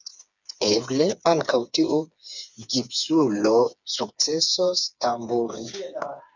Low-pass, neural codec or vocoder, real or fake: 7.2 kHz; codec, 16 kHz, 4 kbps, FreqCodec, smaller model; fake